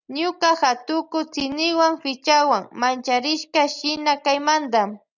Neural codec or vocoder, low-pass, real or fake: none; 7.2 kHz; real